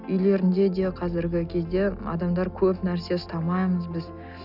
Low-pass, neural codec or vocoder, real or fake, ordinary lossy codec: 5.4 kHz; none; real; none